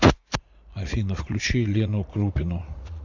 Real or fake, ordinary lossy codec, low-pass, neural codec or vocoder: fake; AAC, 48 kbps; 7.2 kHz; vocoder, 44.1 kHz, 80 mel bands, Vocos